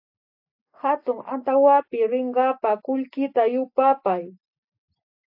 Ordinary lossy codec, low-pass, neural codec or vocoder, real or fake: AAC, 48 kbps; 5.4 kHz; none; real